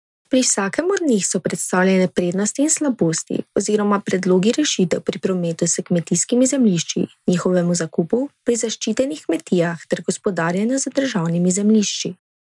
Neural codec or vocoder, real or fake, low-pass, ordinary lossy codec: none; real; 10.8 kHz; none